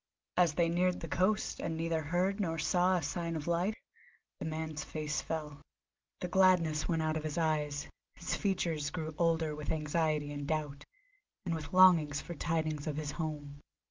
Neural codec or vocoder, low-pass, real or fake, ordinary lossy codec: none; 7.2 kHz; real; Opus, 24 kbps